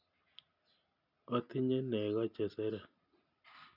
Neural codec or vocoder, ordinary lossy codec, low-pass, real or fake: none; none; 5.4 kHz; real